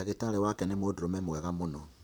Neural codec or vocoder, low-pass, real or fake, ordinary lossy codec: vocoder, 44.1 kHz, 128 mel bands, Pupu-Vocoder; none; fake; none